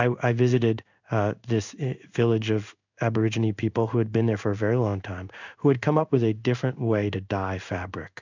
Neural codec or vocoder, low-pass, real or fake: codec, 16 kHz in and 24 kHz out, 1 kbps, XY-Tokenizer; 7.2 kHz; fake